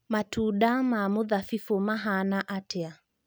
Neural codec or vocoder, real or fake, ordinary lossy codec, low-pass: none; real; none; none